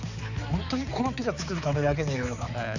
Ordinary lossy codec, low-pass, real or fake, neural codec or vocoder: none; 7.2 kHz; fake; codec, 16 kHz, 4 kbps, X-Codec, HuBERT features, trained on balanced general audio